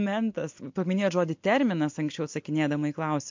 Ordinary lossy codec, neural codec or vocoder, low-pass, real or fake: MP3, 48 kbps; none; 7.2 kHz; real